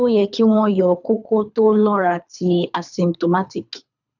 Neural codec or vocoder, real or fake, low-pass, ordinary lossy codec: codec, 24 kHz, 3 kbps, HILCodec; fake; 7.2 kHz; none